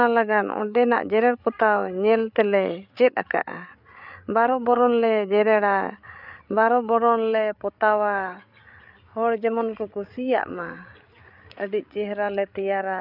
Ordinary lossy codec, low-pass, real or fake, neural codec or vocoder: none; 5.4 kHz; fake; codec, 16 kHz, 8 kbps, FreqCodec, larger model